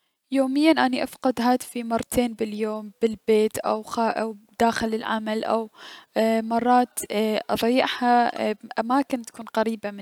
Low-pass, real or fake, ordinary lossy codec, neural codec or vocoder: 19.8 kHz; real; none; none